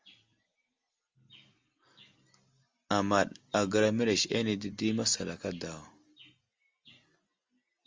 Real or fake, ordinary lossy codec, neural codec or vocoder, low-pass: real; Opus, 32 kbps; none; 7.2 kHz